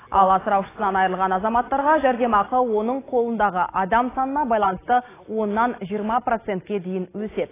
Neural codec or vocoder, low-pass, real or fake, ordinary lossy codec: none; 3.6 kHz; real; AAC, 16 kbps